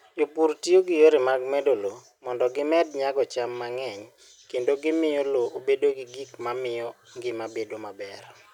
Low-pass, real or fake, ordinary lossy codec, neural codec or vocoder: 19.8 kHz; real; none; none